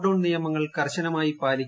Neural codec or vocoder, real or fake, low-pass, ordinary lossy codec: none; real; none; none